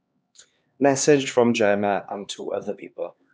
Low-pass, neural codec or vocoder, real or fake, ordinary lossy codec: none; codec, 16 kHz, 2 kbps, X-Codec, HuBERT features, trained on LibriSpeech; fake; none